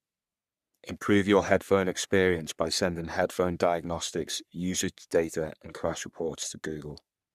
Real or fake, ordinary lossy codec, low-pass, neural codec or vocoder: fake; none; 14.4 kHz; codec, 44.1 kHz, 3.4 kbps, Pupu-Codec